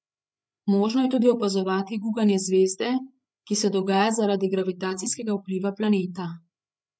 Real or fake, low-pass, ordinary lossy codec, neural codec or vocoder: fake; none; none; codec, 16 kHz, 8 kbps, FreqCodec, larger model